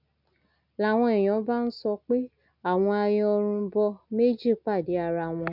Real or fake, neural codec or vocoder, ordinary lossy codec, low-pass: real; none; none; 5.4 kHz